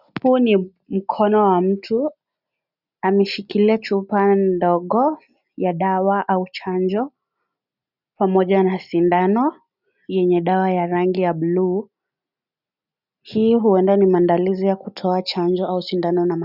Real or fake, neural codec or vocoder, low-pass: real; none; 5.4 kHz